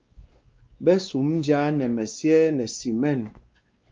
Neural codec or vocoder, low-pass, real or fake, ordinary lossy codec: codec, 16 kHz, 2 kbps, X-Codec, WavLM features, trained on Multilingual LibriSpeech; 7.2 kHz; fake; Opus, 32 kbps